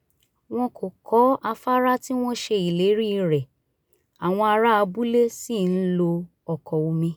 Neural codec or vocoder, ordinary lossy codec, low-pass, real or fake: none; none; none; real